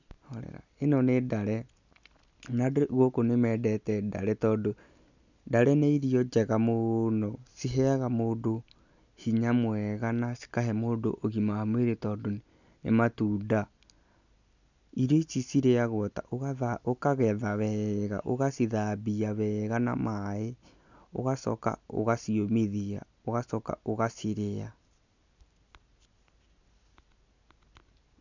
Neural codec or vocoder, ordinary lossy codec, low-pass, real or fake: none; none; 7.2 kHz; real